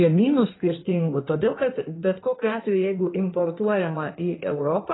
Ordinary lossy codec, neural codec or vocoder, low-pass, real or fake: AAC, 16 kbps; codec, 16 kHz in and 24 kHz out, 1.1 kbps, FireRedTTS-2 codec; 7.2 kHz; fake